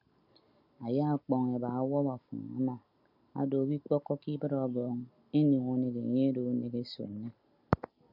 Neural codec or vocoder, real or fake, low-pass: none; real; 5.4 kHz